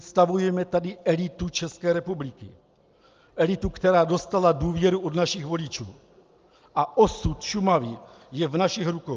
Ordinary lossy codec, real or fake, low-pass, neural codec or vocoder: Opus, 24 kbps; real; 7.2 kHz; none